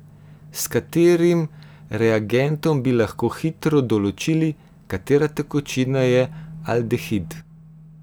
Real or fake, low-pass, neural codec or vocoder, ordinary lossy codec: real; none; none; none